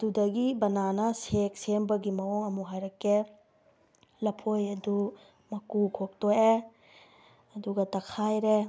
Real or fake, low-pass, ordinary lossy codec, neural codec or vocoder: real; none; none; none